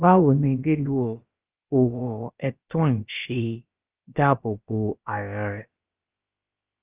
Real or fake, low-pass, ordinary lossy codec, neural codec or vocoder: fake; 3.6 kHz; Opus, 32 kbps; codec, 16 kHz, about 1 kbps, DyCAST, with the encoder's durations